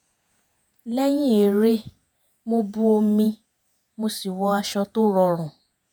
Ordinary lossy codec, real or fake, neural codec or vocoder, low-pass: none; fake; vocoder, 48 kHz, 128 mel bands, Vocos; none